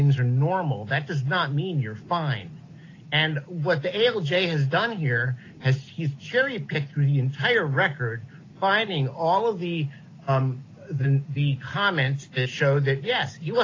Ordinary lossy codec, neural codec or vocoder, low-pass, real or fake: AAC, 32 kbps; none; 7.2 kHz; real